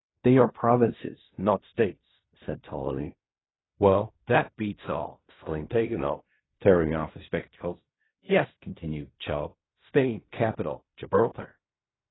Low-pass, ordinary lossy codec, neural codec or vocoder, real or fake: 7.2 kHz; AAC, 16 kbps; codec, 16 kHz in and 24 kHz out, 0.4 kbps, LongCat-Audio-Codec, fine tuned four codebook decoder; fake